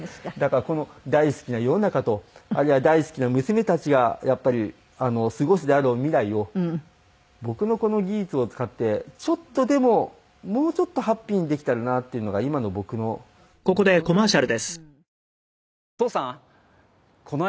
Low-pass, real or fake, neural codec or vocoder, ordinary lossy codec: none; real; none; none